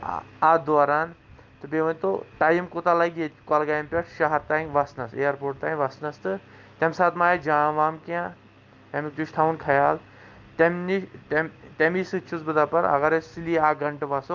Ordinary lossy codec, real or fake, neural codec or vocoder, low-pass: Opus, 24 kbps; real; none; 7.2 kHz